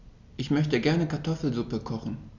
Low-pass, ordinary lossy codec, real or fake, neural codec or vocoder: 7.2 kHz; none; real; none